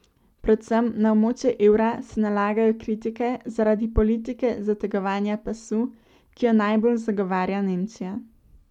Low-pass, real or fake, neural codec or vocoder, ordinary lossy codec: 19.8 kHz; real; none; none